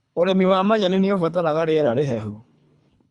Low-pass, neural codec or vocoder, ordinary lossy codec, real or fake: 10.8 kHz; codec, 24 kHz, 3 kbps, HILCodec; Opus, 64 kbps; fake